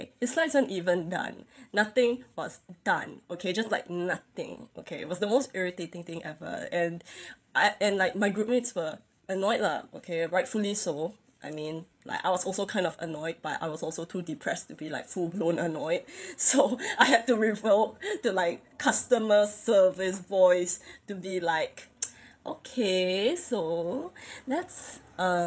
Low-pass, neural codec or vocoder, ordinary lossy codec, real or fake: none; codec, 16 kHz, 8 kbps, FreqCodec, larger model; none; fake